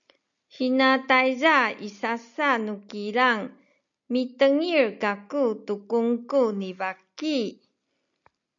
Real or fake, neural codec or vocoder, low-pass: real; none; 7.2 kHz